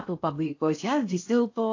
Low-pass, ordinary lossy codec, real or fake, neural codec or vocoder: 7.2 kHz; AAC, 48 kbps; fake; codec, 16 kHz in and 24 kHz out, 0.6 kbps, FocalCodec, streaming, 4096 codes